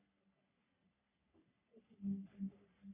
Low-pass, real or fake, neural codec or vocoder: 3.6 kHz; fake; codec, 44.1 kHz, 3.4 kbps, Pupu-Codec